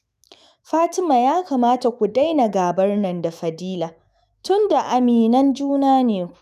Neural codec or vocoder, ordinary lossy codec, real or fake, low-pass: autoencoder, 48 kHz, 128 numbers a frame, DAC-VAE, trained on Japanese speech; none; fake; 14.4 kHz